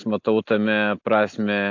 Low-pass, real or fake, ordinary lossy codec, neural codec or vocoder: 7.2 kHz; real; AAC, 48 kbps; none